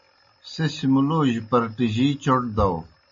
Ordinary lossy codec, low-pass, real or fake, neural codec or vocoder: MP3, 32 kbps; 7.2 kHz; real; none